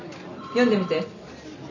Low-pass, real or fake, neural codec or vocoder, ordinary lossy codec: 7.2 kHz; real; none; none